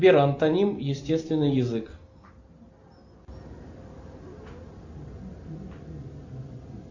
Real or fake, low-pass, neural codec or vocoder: real; 7.2 kHz; none